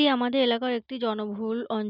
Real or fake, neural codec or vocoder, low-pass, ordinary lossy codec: real; none; 5.4 kHz; none